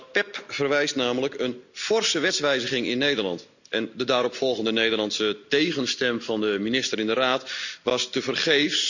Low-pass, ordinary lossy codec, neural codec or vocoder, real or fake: 7.2 kHz; none; none; real